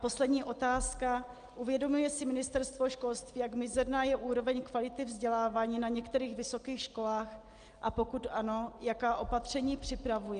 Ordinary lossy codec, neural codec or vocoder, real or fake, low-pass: Opus, 32 kbps; none; real; 9.9 kHz